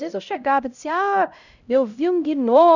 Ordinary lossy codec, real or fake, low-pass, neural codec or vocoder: none; fake; 7.2 kHz; codec, 16 kHz, 0.5 kbps, X-Codec, HuBERT features, trained on LibriSpeech